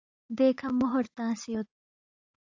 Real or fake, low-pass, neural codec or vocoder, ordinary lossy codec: fake; 7.2 kHz; vocoder, 44.1 kHz, 80 mel bands, Vocos; AAC, 48 kbps